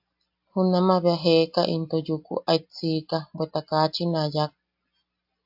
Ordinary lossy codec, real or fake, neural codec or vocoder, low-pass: AAC, 48 kbps; real; none; 5.4 kHz